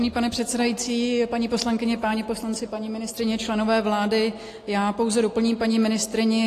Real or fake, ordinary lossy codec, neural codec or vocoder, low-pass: real; AAC, 48 kbps; none; 14.4 kHz